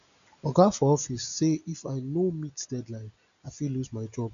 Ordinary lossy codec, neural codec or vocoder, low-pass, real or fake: none; none; 7.2 kHz; real